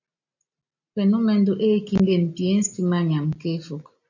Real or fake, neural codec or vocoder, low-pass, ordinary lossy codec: real; none; 7.2 kHz; AAC, 48 kbps